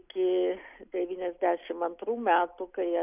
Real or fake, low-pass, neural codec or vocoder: real; 3.6 kHz; none